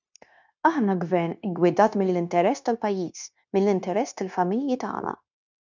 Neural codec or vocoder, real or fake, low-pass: codec, 16 kHz, 0.9 kbps, LongCat-Audio-Codec; fake; 7.2 kHz